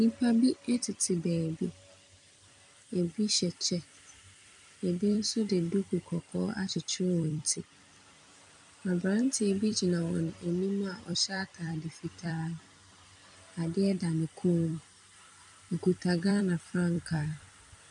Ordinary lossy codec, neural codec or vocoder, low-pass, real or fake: MP3, 96 kbps; vocoder, 44.1 kHz, 128 mel bands every 256 samples, BigVGAN v2; 10.8 kHz; fake